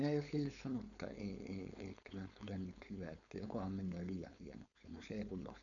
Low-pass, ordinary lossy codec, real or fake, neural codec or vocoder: 7.2 kHz; none; fake; codec, 16 kHz, 4.8 kbps, FACodec